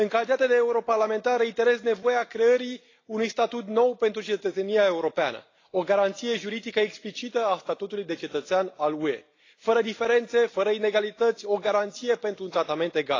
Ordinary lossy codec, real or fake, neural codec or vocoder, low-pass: AAC, 32 kbps; real; none; 7.2 kHz